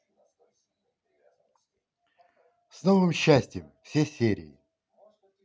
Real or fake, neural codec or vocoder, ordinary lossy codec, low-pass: real; none; none; none